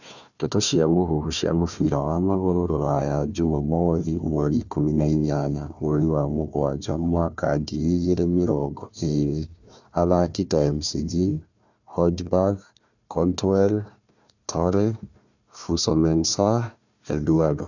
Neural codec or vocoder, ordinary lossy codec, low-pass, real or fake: codec, 16 kHz, 1 kbps, FunCodec, trained on Chinese and English, 50 frames a second; none; 7.2 kHz; fake